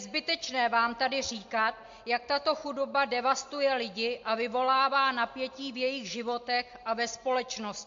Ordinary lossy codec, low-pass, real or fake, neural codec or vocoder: MP3, 48 kbps; 7.2 kHz; real; none